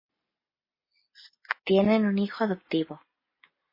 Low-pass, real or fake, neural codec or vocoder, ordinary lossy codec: 5.4 kHz; real; none; MP3, 24 kbps